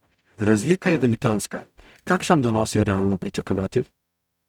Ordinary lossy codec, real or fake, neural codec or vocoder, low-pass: none; fake; codec, 44.1 kHz, 0.9 kbps, DAC; 19.8 kHz